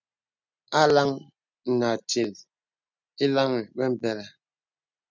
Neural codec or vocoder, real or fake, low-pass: none; real; 7.2 kHz